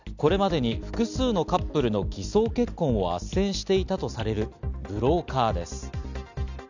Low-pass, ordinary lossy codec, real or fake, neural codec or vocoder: 7.2 kHz; none; real; none